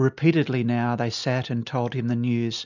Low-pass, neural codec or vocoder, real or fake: 7.2 kHz; none; real